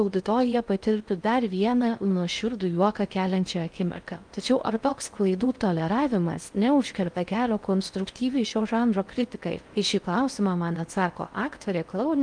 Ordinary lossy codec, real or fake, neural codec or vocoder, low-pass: Opus, 32 kbps; fake; codec, 16 kHz in and 24 kHz out, 0.6 kbps, FocalCodec, streaming, 2048 codes; 9.9 kHz